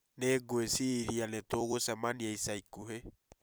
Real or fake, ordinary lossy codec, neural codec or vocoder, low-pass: fake; none; vocoder, 44.1 kHz, 128 mel bands every 256 samples, BigVGAN v2; none